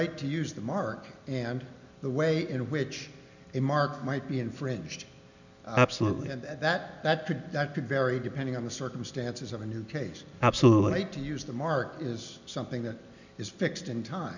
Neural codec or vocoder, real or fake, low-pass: none; real; 7.2 kHz